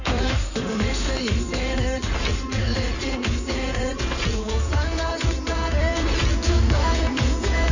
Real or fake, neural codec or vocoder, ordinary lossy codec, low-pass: fake; codec, 16 kHz in and 24 kHz out, 2.2 kbps, FireRedTTS-2 codec; none; 7.2 kHz